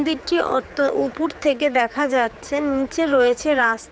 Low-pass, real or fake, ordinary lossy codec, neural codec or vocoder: none; fake; none; codec, 16 kHz, 4 kbps, X-Codec, HuBERT features, trained on general audio